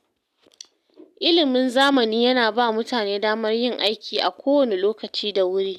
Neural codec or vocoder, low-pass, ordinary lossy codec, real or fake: none; 14.4 kHz; none; real